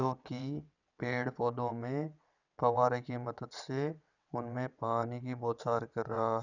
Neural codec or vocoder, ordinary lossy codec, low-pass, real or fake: vocoder, 22.05 kHz, 80 mel bands, WaveNeXt; none; 7.2 kHz; fake